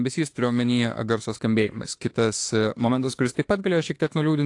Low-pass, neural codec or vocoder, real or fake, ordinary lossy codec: 10.8 kHz; autoencoder, 48 kHz, 32 numbers a frame, DAC-VAE, trained on Japanese speech; fake; AAC, 48 kbps